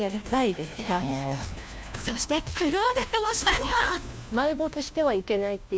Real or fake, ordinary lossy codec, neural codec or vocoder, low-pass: fake; none; codec, 16 kHz, 1 kbps, FunCodec, trained on LibriTTS, 50 frames a second; none